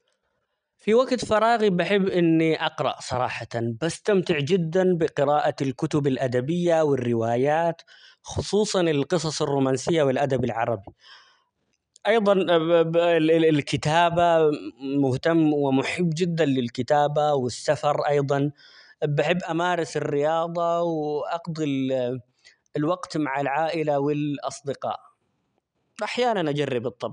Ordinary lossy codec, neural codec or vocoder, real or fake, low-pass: none; none; real; 9.9 kHz